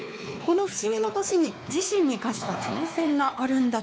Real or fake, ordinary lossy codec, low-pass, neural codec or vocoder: fake; none; none; codec, 16 kHz, 2 kbps, X-Codec, WavLM features, trained on Multilingual LibriSpeech